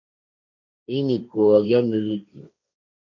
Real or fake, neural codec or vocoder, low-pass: fake; codec, 16 kHz, 1.1 kbps, Voila-Tokenizer; 7.2 kHz